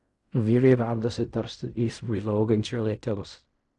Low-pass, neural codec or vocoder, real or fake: 10.8 kHz; codec, 16 kHz in and 24 kHz out, 0.4 kbps, LongCat-Audio-Codec, fine tuned four codebook decoder; fake